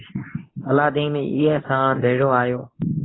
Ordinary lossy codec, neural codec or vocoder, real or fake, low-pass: AAC, 16 kbps; codec, 24 kHz, 0.9 kbps, WavTokenizer, medium speech release version 2; fake; 7.2 kHz